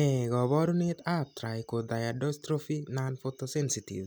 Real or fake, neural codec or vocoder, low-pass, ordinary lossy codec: real; none; none; none